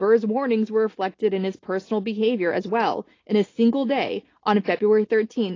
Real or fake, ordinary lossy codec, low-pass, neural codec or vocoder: real; AAC, 32 kbps; 7.2 kHz; none